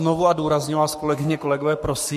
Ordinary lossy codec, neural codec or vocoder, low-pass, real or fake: MP3, 64 kbps; codec, 44.1 kHz, 7.8 kbps, Pupu-Codec; 14.4 kHz; fake